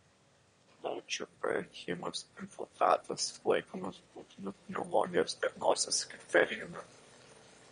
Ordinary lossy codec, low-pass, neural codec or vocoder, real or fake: MP3, 48 kbps; 9.9 kHz; autoencoder, 22.05 kHz, a latent of 192 numbers a frame, VITS, trained on one speaker; fake